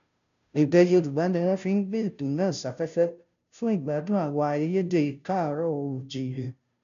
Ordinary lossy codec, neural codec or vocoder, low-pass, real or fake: none; codec, 16 kHz, 0.5 kbps, FunCodec, trained on Chinese and English, 25 frames a second; 7.2 kHz; fake